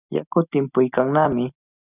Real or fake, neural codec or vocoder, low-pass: real; none; 3.6 kHz